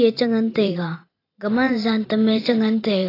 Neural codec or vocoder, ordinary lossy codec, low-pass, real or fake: vocoder, 44.1 kHz, 128 mel bands every 512 samples, BigVGAN v2; AAC, 24 kbps; 5.4 kHz; fake